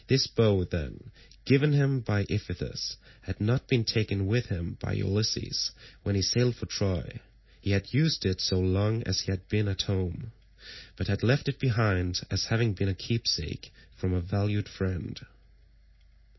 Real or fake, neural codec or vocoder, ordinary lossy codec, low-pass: real; none; MP3, 24 kbps; 7.2 kHz